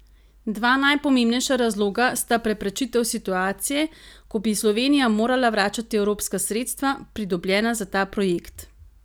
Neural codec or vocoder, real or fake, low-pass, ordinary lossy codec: none; real; none; none